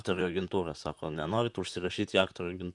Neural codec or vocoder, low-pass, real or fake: vocoder, 44.1 kHz, 128 mel bands, Pupu-Vocoder; 10.8 kHz; fake